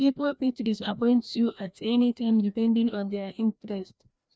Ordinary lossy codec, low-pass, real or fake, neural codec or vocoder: none; none; fake; codec, 16 kHz, 1 kbps, FreqCodec, larger model